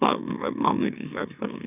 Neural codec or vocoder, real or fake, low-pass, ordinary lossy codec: autoencoder, 44.1 kHz, a latent of 192 numbers a frame, MeloTTS; fake; 3.6 kHz; none